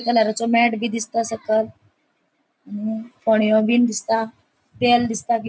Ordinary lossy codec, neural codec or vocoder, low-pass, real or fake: none; none; none; real